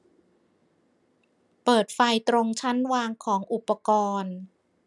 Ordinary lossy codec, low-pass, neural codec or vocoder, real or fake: none; none; none; real